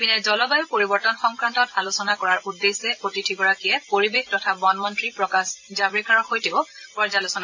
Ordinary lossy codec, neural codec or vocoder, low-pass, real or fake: AAC, 48 kbps; vocoder, 44.1 kHz, 128 mel bands every 256 samples, BigVGAN v2; 7.2 kHz; fake